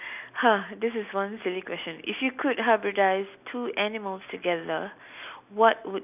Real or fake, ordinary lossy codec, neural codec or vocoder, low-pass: real; none; none; 3.6 kHz